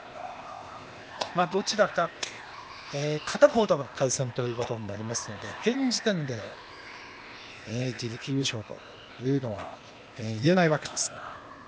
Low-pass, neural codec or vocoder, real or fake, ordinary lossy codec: none; codec, 16 kHz, 0.8 kbps, ZipCodec; fake; none